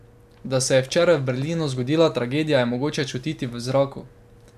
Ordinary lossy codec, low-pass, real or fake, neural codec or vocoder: none; 14.4 kHz; real; none